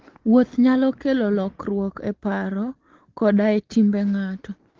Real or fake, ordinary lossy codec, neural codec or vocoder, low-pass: real; Opus, 16 kbps; none; 7.2 kHz